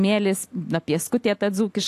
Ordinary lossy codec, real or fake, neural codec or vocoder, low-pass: AAC, 64 kbps; real; none; 14.4 kHz